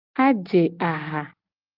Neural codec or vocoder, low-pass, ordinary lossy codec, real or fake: none; 5.4 kHz; Opus, 24 kbps; real